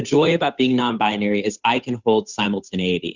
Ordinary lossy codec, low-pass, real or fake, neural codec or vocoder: Opus, 64 kbps; 7.2 kHz; fake; codec, 16 kHz, 8 kbps, FunCodec, trained on Chinese and English, 25 frames a second